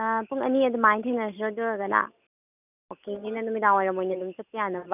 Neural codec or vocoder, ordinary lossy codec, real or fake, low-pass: none; none; real; 3.6 kHz